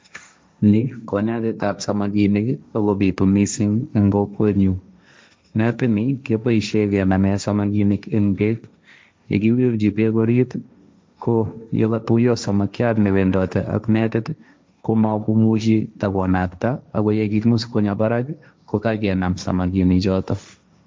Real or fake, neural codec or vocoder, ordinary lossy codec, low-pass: fake; codec, 16 kHz, 1.1 kbps, Voila-Tokenizer; none; none